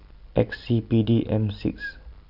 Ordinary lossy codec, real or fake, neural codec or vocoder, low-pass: none; real; none; 5.4 kHz